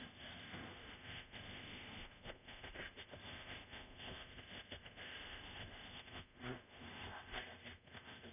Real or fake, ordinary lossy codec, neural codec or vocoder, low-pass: fake; none; codec, 24 kHz, 0.5 kbps, DualCodec; 3.6 kHz